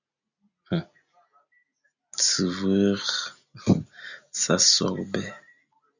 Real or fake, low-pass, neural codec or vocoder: real; 7.2 kHz; none